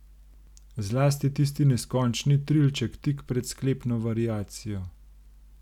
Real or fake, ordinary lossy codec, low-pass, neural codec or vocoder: real; none; 19.8 kHz; none